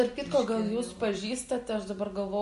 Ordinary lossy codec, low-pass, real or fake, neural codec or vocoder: MP3, 48 kbps; 14.4 kHz; real; none